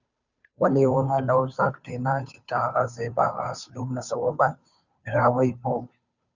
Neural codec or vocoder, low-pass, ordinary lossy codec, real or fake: codec, 16 kHz, 2 kbps, FunCodec, trained on Chinese and English, 25 frames a second; 7.2 kHz; Opus, 64 kbps; fake